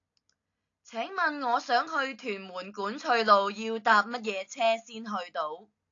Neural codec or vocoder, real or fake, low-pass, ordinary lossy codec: none; real; 7.2 kHz; AAC, 48 kbps